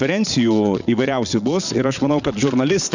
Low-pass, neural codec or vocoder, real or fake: 7.2 kHz; vocoder, 22.05 kHz, 80 mel bands, Vocos; fake